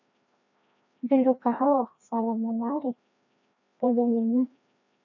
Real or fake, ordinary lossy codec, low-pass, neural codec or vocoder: fake; none; 7.2 kHz; codec, 16 kHz, 1 kbps, FreqCodec, larger model